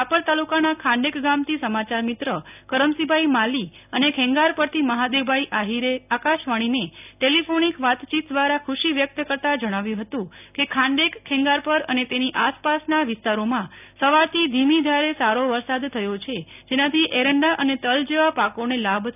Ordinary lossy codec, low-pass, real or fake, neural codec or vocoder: none; 3.6 kHz; real; none